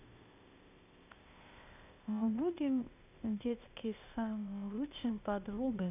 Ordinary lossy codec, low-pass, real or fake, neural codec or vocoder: none; 3.6 kHz; fake; codec, 16 kHz, 0.8 kbps, ZipCodec